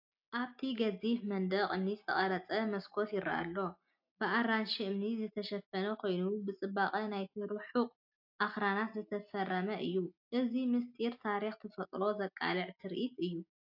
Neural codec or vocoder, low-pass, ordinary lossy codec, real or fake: none; 5.4 kHz; AAC, 48 kbps; real